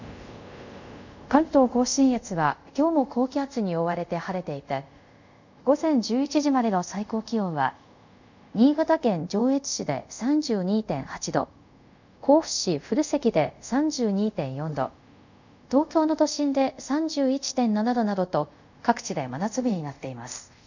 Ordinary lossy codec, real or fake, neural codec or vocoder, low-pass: none; fake; codec, 24 kHz, 0.5 kbps, DualCodec; 7.2 kHz